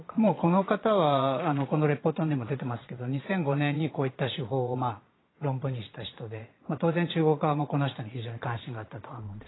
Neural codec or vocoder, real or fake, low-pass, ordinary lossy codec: vocoder, 22.05 kHz, 80 mel bands, Vocos; fake; 7.2 kHz; AAC, 16 kbps